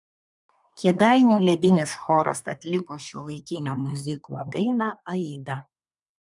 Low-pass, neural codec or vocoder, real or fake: 10.8 kHz; codec, 24 kHz, 1 kbps, SNAC; fake